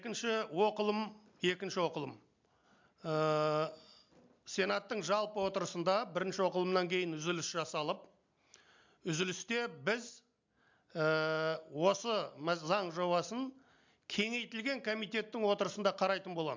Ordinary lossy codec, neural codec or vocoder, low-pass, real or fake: MP3, 64 kbps; none; 7.2 kHz; real